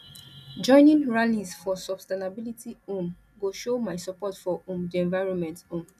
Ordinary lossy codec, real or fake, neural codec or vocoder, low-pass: none; real; none; 14.4 kHz